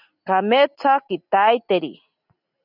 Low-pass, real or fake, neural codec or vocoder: 5.4 kHz; real; none